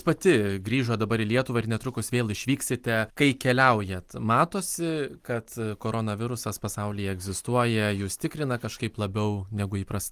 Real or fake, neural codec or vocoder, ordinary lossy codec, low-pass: real; none; Opus, 32 kbps; 14.4 kHz